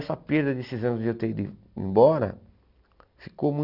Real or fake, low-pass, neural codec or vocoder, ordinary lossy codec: real; 5.4 kHz; none; none